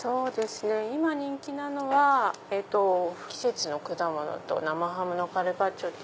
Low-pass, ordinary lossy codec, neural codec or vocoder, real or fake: none; none; none; real